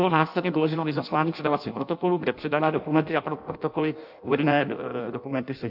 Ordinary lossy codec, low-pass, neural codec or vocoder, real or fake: AAC, 48 kbps; 5.4 kHz; codec, 16 kHz in and 24 kHz out, 0.6 kbps, FireRedTTS-2 codec; fake